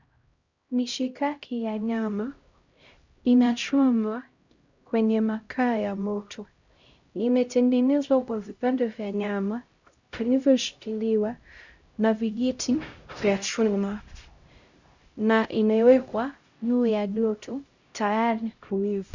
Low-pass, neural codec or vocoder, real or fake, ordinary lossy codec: 7.2 kHz; codec, 16 kHz, 0.5 kbps, X-Codec, HuBERT features, trained on LibriSpeech; fake; Opus, 64 kbps